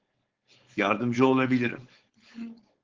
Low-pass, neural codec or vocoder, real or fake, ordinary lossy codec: 7.2 kHz; codec, 16 kHz, 4.8 kbps, FACodec; fake; Opus, 16 kbps